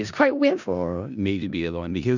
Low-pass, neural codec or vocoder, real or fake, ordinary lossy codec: 7.2 kHz; codec, 16 kHz in and 24 kHz out, 0.4 kbps, LongCat-Audio-Codec, four codebook decoder; fake; none